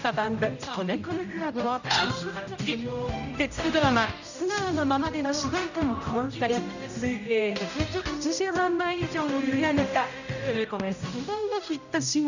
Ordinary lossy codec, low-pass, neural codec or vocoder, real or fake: none; 7.2 kHz; codec, 16 kHz, 0.5 kbps, X-Codec, HuBERT features, trained on general audio; fake